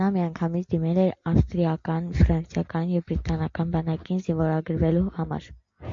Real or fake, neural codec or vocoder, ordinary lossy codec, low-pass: real; none; MP3, 48 kbps; 7.2 kHz